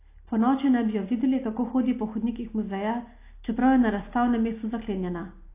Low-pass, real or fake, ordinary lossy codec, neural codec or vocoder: 3.6 kHz; real; AAC, 24 kbps; none